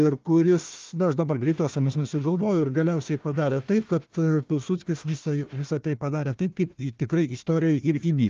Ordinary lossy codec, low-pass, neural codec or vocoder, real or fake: Opus, 32 kbps; 7.2 kHz; codec, 16 kHz, 1 kbps, FunCodec, trained on Chinese and English, 50 frames a second; fake